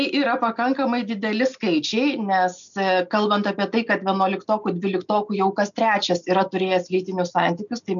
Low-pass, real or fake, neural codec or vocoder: 7.2 kHz; real; none